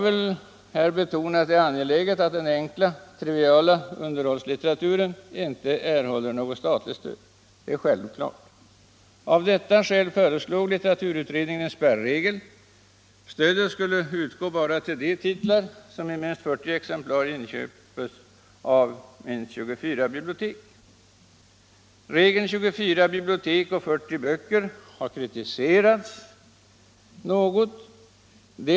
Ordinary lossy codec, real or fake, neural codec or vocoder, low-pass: none; real; none; none